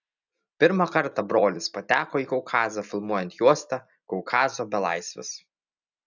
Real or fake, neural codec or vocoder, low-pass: real; none; 7.2 kHz